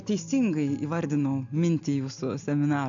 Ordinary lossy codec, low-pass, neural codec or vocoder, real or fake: AAC, 64 kbps; 7.2 kHz; none; real